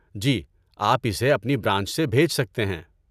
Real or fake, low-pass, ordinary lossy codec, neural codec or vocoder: real; 14.4 kHz; none; none